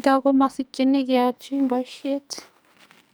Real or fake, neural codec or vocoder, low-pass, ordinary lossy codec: fake; codec, 44.1 kHz, 2.6 kbps, SNAC; none; none